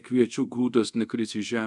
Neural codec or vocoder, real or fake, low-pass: codec, 24 kHz, 0.5 kbps, DualCodec; fake; 10.8 kHz